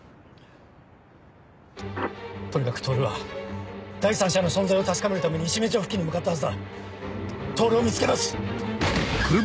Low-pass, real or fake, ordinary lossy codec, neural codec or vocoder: none; real; none; none